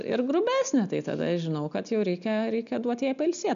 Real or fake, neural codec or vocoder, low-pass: real; none; 7.2 kHz